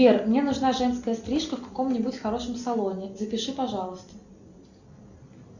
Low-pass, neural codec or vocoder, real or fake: 7.2 kHz; none; real